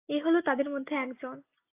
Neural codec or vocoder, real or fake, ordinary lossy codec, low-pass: none; real; AAC, 24 kbps; 3.6 kHz